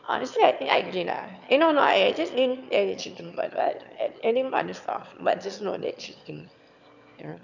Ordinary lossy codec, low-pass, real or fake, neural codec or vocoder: none; 7.2 kHz; fake; autoencoder, 22.05 kHz, a latent of 192 numbers a frame, VITS, trained on one speaker